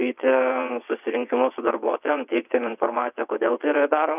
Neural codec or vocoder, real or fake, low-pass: vocoder, 22.05 kHz, 80 mel bands, WaveNeXt; fake; 3.6 kHz